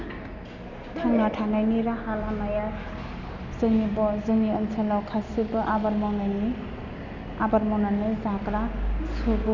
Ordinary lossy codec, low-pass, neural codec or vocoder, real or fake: none; 7.2 kHz; none; real